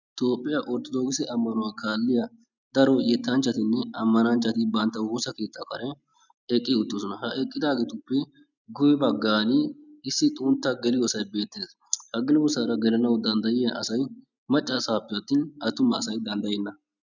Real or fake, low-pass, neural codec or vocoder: real; 7.2 kHz; none